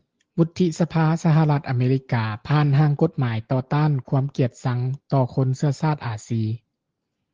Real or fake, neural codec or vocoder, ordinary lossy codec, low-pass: real; none; Opus, 16 kbps; 7.2 kHz